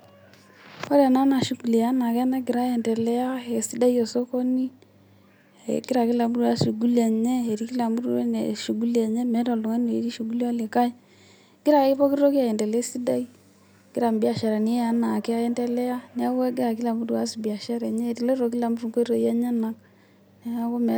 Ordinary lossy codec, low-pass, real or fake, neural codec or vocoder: none; none; real; none